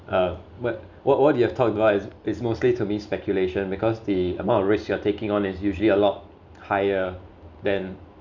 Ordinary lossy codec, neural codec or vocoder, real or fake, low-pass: none; none; real; 7.2 kHz